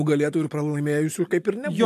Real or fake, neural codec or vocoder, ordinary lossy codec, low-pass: real; none; AAC, 64 kbps; 14.4 kHz